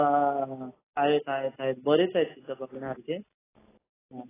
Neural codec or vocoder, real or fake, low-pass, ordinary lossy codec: none; real; 3.6 kHz; AAC, 16 kbps